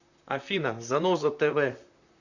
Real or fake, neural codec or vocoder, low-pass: fake; vocoder, 44.1 kHz, 128 mel bands, Pupu-Vocoder; 7.2 kHz